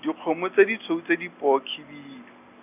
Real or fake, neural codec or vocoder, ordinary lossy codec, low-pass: real; none; none; 3.6 kHz